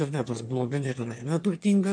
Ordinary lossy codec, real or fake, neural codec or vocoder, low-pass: MP3, 64 kbps; fake; autoencoder, 22.05 kHz, a latent of 192 numbers a frame, VITS, trained on one speaker; 9.9 kHz